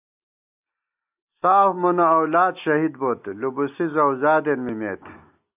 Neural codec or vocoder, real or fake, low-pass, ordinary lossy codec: none; real; 3.6 kHz; AAC, 32 kbps